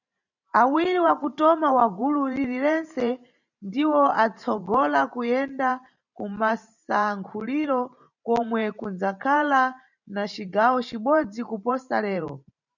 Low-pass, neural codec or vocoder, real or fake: 7.2 kHz; vocoder, 44.1 kHz, 128 mel bands every 512 samples, BigVGAN v2; fake